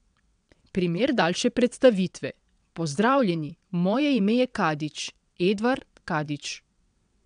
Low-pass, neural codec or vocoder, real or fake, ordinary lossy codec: 9.9 kHz; vocoder, 22.05 kHz, 80 mel bands, WaveNeXt; fake; none